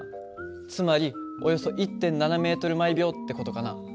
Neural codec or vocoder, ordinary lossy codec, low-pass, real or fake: none; none; none; real